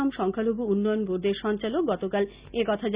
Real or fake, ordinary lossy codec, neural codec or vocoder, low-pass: real; Opus, 64 kbps; none; 3.6 kHz